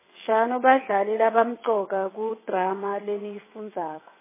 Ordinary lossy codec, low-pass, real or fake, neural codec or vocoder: MP3, 16 kbps; 3.6 kHz; fake; vocoder, 22.05 kHz, 80 mel bands, WaveNeXt